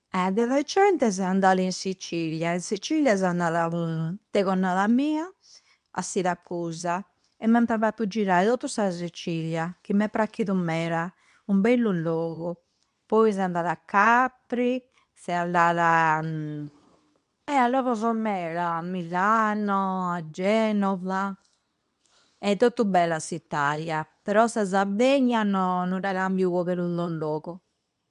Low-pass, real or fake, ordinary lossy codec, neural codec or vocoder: 10.8 kHz; fake; none; codec, 24 kHz, 0.9 kbps, WavTokenizer, medium speech release version 2